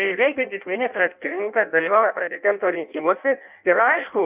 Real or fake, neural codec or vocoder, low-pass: fake; codec, 16 kHz in and 24 kHz out, 0.6 kbps, FireRedTTS-2 codec; 3.6 kHz